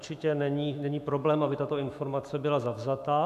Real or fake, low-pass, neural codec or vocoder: fake; 14.4 kHz; autoencoder, 48 kHz, 128 numbers a frame, DAC-VAE, trained on Japanese speech